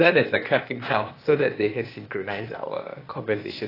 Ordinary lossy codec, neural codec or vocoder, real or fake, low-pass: AAC, 24 kbps; codec, 16 kHz, 0.8 kbps, ZipCodec; fake; 5.4 kHz